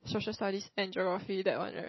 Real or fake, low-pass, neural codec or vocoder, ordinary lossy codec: real; 7.2 kHz; none; MP3, 24 kbps